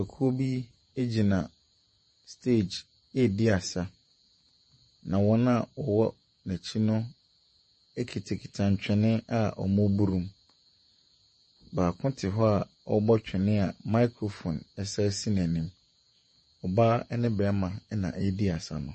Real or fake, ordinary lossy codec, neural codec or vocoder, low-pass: fake; MP3, 32 kbps; vocoder, 48 kHz, 128 mel bands, Vocos; 10.8 kHz